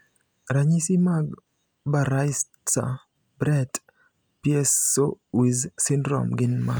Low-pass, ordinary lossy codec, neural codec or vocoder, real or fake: none; none; none; real